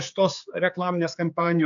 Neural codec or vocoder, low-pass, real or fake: codec, 16 kHz, 4 kbps, X-Codec, HuBERT features, trained on general audio; 7.2 kHz; fake